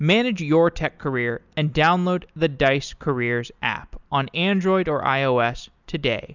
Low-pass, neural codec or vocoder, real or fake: 7.2 kHz; none; real